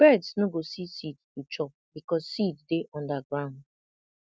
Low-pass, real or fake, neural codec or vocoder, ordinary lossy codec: none; real; none; none